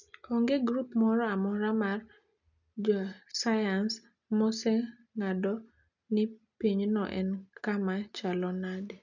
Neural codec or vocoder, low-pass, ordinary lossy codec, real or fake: none; 7.2 kHz; none; real